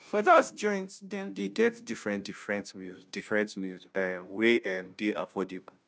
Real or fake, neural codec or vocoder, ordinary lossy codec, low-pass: fake; codec, 16 kHz, 0.5 kbps, FunCodec, trained on Chinese and English, 25 frames a second; none; none